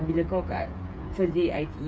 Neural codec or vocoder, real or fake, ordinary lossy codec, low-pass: codec, 16 kHz, 8 kbps, FreqCodec, smaller model; fake; none; none